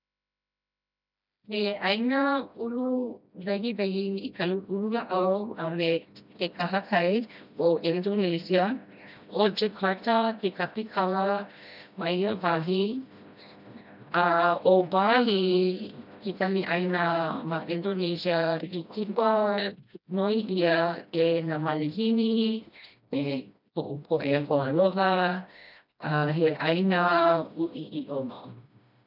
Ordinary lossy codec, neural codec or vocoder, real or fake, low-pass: none; codec, 16 kHz, 1 kbps, FreqCodec, smaller model; fake; 5.4 kHz